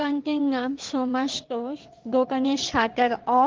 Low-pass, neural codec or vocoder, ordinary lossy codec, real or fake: 7.2 kHz; vocoder, 22.05 kHz, 80 mel bands, WaveNeXt; Opus, 16 kbps; fake